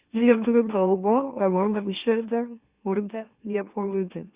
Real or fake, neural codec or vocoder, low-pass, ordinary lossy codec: fake; autoencoder, 44.1 kHz, a latent of 192 numbers a frame, MeloTTS; 3.6 kHz; Opus, 64 kbps